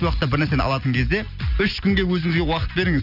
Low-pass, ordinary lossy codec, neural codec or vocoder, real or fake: 5.4 kHz; none; none; real